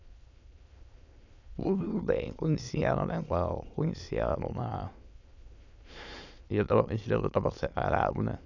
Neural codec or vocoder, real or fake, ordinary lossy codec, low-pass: autoencoder, 22.05 kHz, a latent of 192 numbers a frame, VITS, trained on many speakers; fake; none; 7.2 kHz